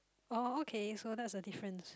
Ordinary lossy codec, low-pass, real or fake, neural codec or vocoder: none; none; real; none